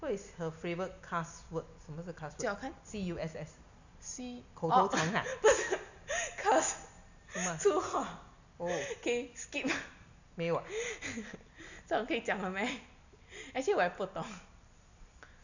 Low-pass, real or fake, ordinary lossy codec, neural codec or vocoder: 7.2 kHz; real; none; none